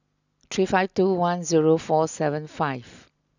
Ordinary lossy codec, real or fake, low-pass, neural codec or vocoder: none; real; 7.2 kHz; none